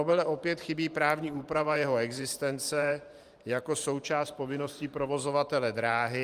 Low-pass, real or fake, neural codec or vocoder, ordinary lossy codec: 14.4 kHz; fake; vocoder, 44.1 kHz, 128 mel bands every 512 samples, BigVGAN v2; Opus, 32 kbps